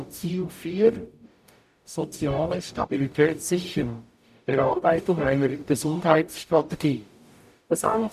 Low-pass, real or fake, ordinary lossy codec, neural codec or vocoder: 14.4 kHz; fake; none; codec, 44.1 kHz, 0.9 kbps, DAC